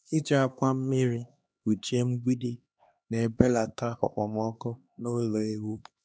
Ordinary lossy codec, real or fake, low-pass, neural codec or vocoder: none; fake; none; codec, 16 kHz, 2 kbps, X-Codec, HuBERT features, trained on LibriSpeech